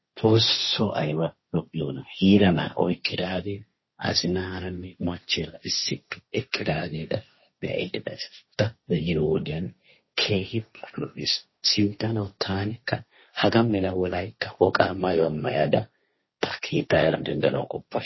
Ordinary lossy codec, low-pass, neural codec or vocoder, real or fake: MP3, 24 kbps; 7.2 kHz; codec, 16 kHz, 1.1 kbps, Voila-Tokenizer; fake